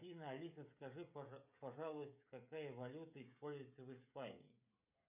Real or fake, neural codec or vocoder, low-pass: fake; codec, 16 kHz, 16 kbps, FreqCodec, smaller model; 3.6 kHz